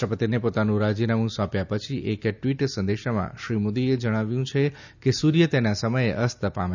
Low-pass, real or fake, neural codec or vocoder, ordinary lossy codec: 7.2 kHz; real; none; none